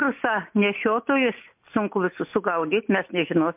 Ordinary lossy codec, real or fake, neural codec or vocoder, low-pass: MP3, 32 kbps; real; none; 3.6 kHz